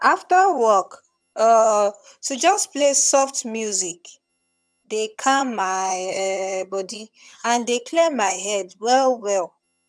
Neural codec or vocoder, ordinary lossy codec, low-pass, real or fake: vocoder, 22.05 kHz, 80 mel bands, HiFi-GAN; none; none; fake